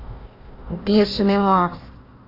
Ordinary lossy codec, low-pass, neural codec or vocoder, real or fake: AAC, 32 kbps; 5.4 kHz; codec, 16 kHz, 1 kbps, FunCodec, trained on Chinese and English, 50 frames a second; fake